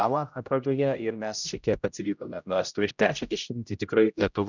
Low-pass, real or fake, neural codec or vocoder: 7.2 kHz; fake; codec, 16 kHz, 0.5 kbps, X-Codec, HuBERT features, trained on general audio